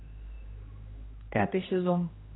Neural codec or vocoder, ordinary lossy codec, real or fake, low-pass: codec, 16 kHz, 1 kbps, X-Codec, HuBERT features, trained on general audio; AAC, 16 kbps; fake; 7.2 kHz